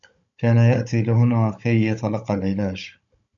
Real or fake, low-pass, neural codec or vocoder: fake; 7.2 kHz; codec, 16 kHz, 16 kbps, FunCodec, trained on Chinese and English, 50 frames a second